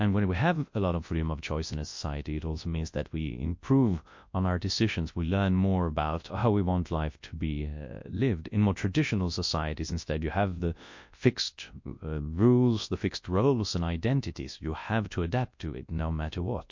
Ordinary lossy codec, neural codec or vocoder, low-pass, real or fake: MP3, 48 kbps; codec, 24 kHz, 0.9 kbps, WavTokenizer, large speech release; 7.2 kHz; fake